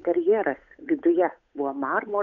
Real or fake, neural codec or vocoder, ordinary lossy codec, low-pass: fake; codec, 16 kHz, 8 kbps, FunCodec, trained on Chinese and English, 25 frames a second; MP3, 64 kbps; 7.2 kHz